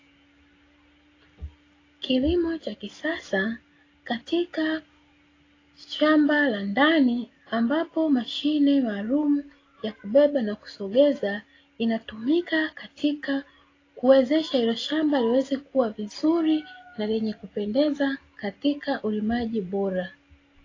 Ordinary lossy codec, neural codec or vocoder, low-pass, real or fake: AAC, 32 kbps; none; 7.2 kHz; real